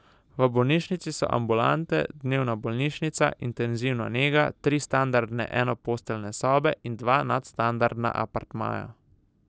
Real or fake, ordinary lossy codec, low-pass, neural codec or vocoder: real; none; none; none